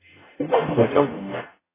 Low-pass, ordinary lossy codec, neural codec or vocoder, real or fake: 3.6 kHz; AAC, 16 kbps; codec, 44.1 kHz, 0.9 kbps, DAC; fake